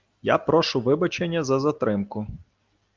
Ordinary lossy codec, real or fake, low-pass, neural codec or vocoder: Opus, 24 kbps; real; 7.2 kHz; none